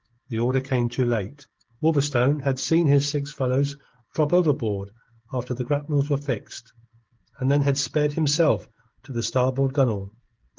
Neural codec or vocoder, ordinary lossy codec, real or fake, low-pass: codec, 16 kHz, 16 kbps, FreqCodec, smaller model; Opus, 16 kbps; fake; 7.2 kHz